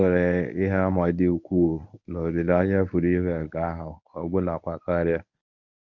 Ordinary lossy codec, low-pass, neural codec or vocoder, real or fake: none; 7.2 kHz; codec, 24 kHz, 0.9 kbps, WavTokenizer, medium speech release version 1; fake